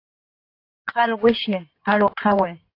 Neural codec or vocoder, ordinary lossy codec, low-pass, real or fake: codec, 16 kHz in and 24 kHz out, 2.2 kbps, FireRedTTS-2 codec; AAC, 32 kbps; 5.4 kHz; fake